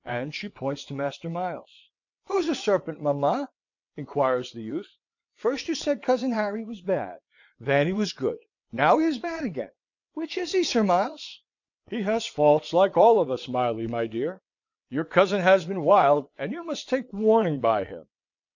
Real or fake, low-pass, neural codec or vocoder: fake; 7.2 kHz; vocoder, 22.05 kHz, 80 mel bands, WaveNeXt